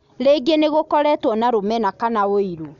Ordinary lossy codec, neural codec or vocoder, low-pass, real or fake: none; none; 7.2 kHz; real